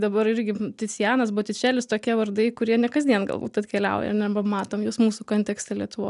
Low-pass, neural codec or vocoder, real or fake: 10.8 kHz; none; real